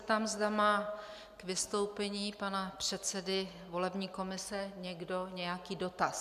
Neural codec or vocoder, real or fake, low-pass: none; real; 14.4 kHz